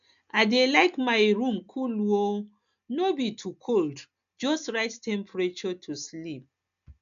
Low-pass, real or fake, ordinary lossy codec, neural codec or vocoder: 7.2 kHz; real; none; none